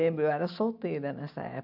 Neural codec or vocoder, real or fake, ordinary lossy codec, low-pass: none; real; AAC, 48 kbps; 5.4 kHz